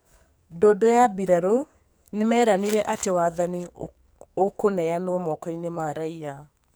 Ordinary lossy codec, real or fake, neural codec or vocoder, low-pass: none; fake; codec, 44.1 kHz, 2.6 kbps, SNAC; none